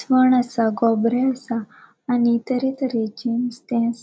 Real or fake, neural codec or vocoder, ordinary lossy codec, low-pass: real; none; none; none